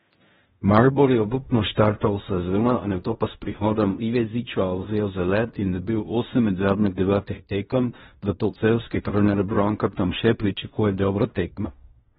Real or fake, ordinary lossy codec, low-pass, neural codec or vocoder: fake; AAC, 16 kbps; 10.8 kHz; codec, 16 kHz in and 24 kHz out, 0.4 kbps, LongCat-Audio-Codec, fine tuned four codebook decoder